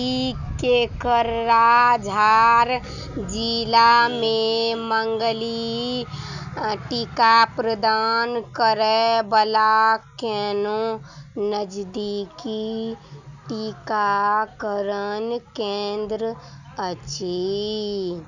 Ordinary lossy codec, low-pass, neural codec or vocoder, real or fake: none; 7.2 kHz; none; real